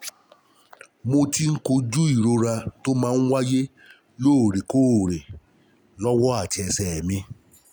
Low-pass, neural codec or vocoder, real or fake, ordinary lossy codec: none; none; real; none